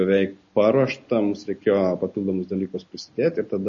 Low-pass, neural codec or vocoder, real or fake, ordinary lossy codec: 7.2 kHz; none; real; MP3, 32 kbps